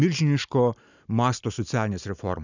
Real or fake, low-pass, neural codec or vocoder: real; 7.2 kHz; none